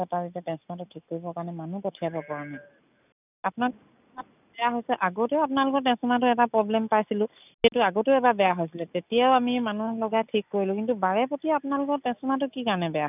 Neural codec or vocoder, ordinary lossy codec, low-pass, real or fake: none; none; 3.6 kHz; real